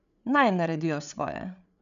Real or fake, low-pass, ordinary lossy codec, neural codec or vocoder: fake; 7.2 kHz; none; codec, 16 kHz, 8 kbps, FreqCodec, larger model